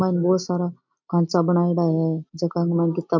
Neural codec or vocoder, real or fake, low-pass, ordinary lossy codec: none; real; 7.2 kHz; MP3, 64 kbps